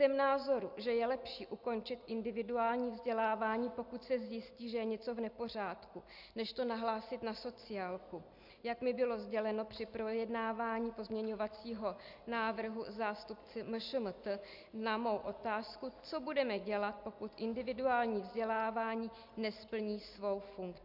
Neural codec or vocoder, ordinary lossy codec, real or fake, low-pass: none; MP3, 48 kbps; real; 5.4 kHz